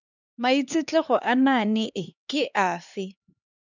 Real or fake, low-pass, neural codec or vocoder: fake; 7.2 kHz; codec, 16 kHz, 2 kbps, X-Codec, HuBERT features, trained on LibriSpeech